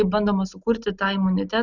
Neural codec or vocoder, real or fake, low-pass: none; real; 7.2 kHz